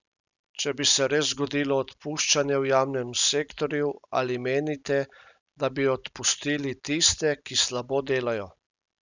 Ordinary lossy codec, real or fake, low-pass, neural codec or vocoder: none; real; 7.2 kHz; none